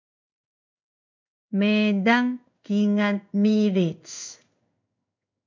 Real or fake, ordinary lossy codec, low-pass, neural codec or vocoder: fake; MP3, 64 kbps; 7.2 kHz; codec, 16 kHz in and 24 kHz out, 1 kbps, XY-Tokenizer